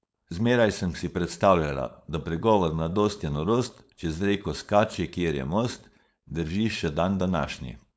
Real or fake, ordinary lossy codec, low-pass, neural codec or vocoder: fake; none; none; codec, 16 kHz, 4.8 kbps, FACodec